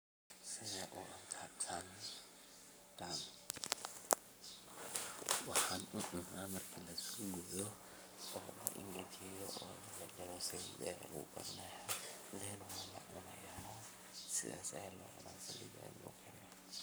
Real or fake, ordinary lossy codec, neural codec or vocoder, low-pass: fake; none; codec, 44.1 kHz, 7.8 kbps, Pupu-Codec; none